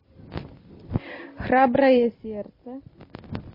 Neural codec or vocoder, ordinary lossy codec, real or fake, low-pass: none; MP3, 24 kbps; real; 5.4 kHz